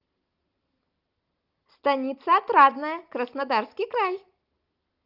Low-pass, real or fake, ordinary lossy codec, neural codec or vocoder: 5.4 kHz; real; Opus, 24 kbps; none